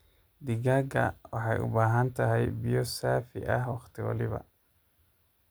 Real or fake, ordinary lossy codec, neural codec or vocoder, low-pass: real; none; none; none